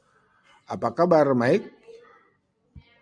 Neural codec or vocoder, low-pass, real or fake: none; 9.9 kHz; real